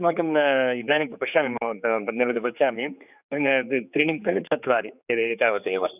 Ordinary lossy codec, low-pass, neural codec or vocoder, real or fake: none; 3.6 kHz; codec, 16 kHz, 4 kbps, X-Codec, HuBERT features, trained on general audio; fake